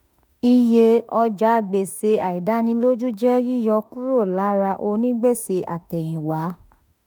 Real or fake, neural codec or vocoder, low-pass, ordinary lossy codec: fake; autoencoder, 48 kHz, 32 numbers a frame, DAC-VAE, trained on Japanese speech; none; none